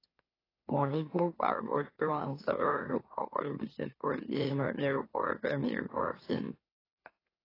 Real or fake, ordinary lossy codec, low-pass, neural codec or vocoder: fake; AAC, 24 kbps; 5.4 kHz; autoencoder, 44.1 kHz, a latent of 192 numbers a frame, MeloTTS